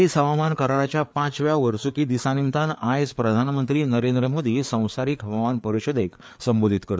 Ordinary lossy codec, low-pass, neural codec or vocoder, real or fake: none; none; codec, 16 kHz, 4 kbps, FreqCodec, larger model; fake